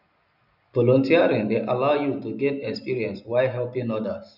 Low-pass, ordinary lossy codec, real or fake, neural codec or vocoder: 5.4 kHz; none; real; none